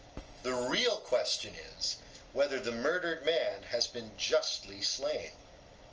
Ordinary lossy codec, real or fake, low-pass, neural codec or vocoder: Opus, 24 kbps; real; 7.2 kHz; none